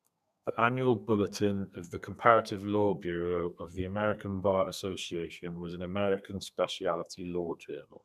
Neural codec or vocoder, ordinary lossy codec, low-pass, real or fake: codec, 32 kHz, 1.9 kbps, SNAC; none; 14.4 kHz; fake